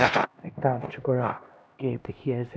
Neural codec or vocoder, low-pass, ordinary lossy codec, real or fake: codec, 16 kHz, 1 kbps, X-Codec, HuBERT features, trained on LibriSpeech; none; none; fake